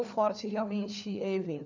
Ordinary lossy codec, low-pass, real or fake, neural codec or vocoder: none; 7.2 kHz; fake; codec, 16 kHz, 4 kbps, FunCodec, trained on LibriTTS, 50 frames a second